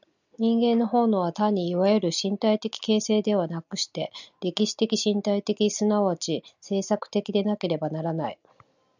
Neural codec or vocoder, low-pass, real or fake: none; 7.2 kHz; real